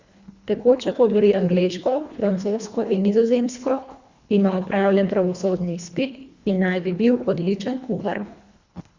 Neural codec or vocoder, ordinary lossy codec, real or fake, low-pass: codec, 24 kHz, 1.5 kbps, HILCodec; Opus, 64 kbps; fake; 7.2 kHz